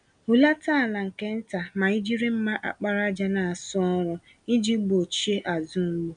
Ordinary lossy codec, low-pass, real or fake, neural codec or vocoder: none; 9.9 kHz; real; none